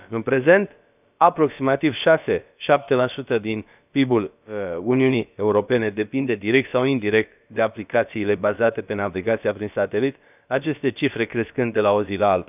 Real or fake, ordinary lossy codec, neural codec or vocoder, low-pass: fake; none; codec, 16 kHz, about 1 kbps, DyCAST, with the encoder's durations; 3.6 kHz